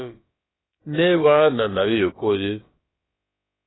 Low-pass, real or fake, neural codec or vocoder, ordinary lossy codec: 7.2 kHz; fake; codec, 16 kHz, about 1 kbps, DyCAST, with the encoder's durations; AAC, 16 kbps